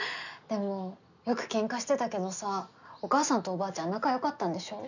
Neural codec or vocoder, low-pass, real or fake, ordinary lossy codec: none; 7.2 kHz; real; none